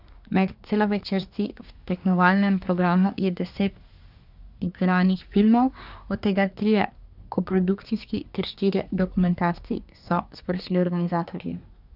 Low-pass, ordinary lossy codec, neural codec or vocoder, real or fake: 5.4 kHz; none; codec, 24 kHz, 1 kbps, SNAC; fake